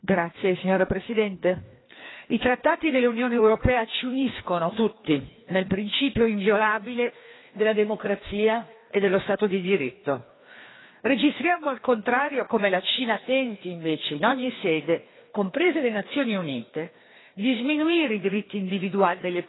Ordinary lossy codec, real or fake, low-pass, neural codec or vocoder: AAC, 16 kbps; fake; 7.2 kHz; codec, 16 kHz, 2 kbps, FreqCodec, larger model